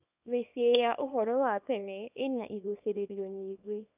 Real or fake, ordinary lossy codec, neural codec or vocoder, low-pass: fake; none; codec, 24 kHz, 0.9 kbps, WavTokenizer, small release; 3.6 kHz